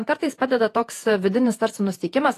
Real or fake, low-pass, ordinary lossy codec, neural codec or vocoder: fake; 14.4 kHz; AAC, 48 kbps; vocoder, 48 kHz, 128 mel bands, Vocos